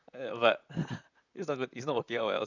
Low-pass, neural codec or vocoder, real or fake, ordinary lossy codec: 7.2 kHz; vocoder, 44.1 kHz, 128 mel bands, Pupu-Vocoder; fake; none